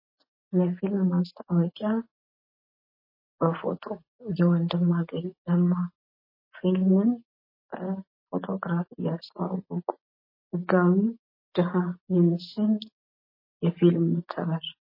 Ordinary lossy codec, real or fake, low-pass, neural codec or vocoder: MP3, 24 kbps; real; 5.4 kHz; none